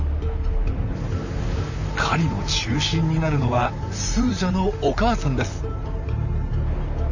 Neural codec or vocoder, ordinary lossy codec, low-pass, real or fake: vocoder, 44.1 kHz, 128 mel bands, Pupu-Vocoder; none; 7.2 kHz; fake